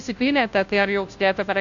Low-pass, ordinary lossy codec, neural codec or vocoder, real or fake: 7.2 kHz; AAC, 64 kbps; codec, 16 kHz, 0.5 kbps, FunCodec, trained on Chinese and English, 25 frames a second; fake